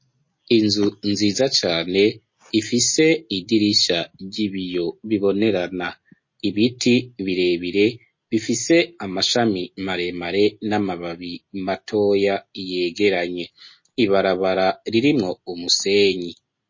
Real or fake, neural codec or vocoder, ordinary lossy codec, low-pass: real; none; MP3, 32 kbps; 7.2 kHz